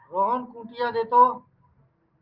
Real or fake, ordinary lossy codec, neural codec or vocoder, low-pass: real; Opus, 32 kbps; none; 5.4 kHz